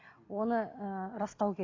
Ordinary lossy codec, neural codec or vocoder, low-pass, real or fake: AAC, 32 kbps; none; 7.2 kHz; real